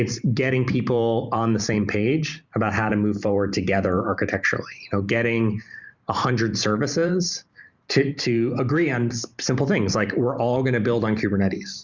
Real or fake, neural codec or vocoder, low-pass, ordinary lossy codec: fake; vocoder, 44.1 kHz, 128 mel bands every 512 samples, BigVGAN v2; 7.2 kHz; Opus, 64 kbps